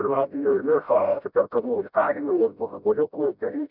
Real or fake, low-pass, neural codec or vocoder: fake; 5.4 kHz; codec, 16 kHz, 0.5 kbps, FreqCodec, smaller model